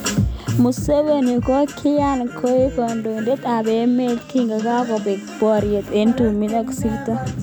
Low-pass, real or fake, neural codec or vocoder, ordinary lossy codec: none; real; none; none